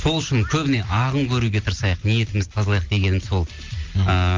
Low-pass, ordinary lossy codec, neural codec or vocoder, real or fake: 7.2 kHz; Opus, 32 kbps; none; real